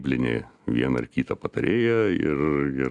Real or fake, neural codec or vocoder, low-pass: real; none; 10.8 kHz